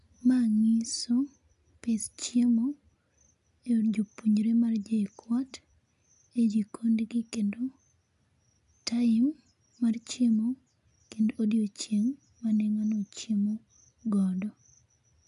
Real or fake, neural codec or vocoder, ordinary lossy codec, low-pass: real; none; none; 10.8 kHz